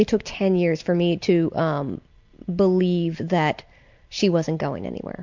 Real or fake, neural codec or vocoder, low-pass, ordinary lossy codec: real; none; 7.2 kHz; MP3, 64 kbps